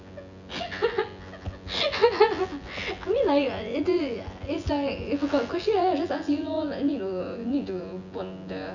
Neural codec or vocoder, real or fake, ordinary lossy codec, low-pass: vocoder, 24 kHz, 100 mel bands, Vocos; fake; none; 7.2 kHz